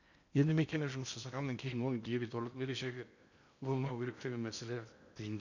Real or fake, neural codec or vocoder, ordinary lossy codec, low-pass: fake; codec, 16 kHz in and 24 kHz out, 0.8 kbps, FocalCodec, streaming, 65536 codes; none; 7.2 kHz